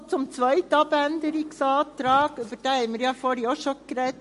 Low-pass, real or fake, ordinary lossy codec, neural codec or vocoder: 14.4 kHz; real; MP3, 48 kbps; none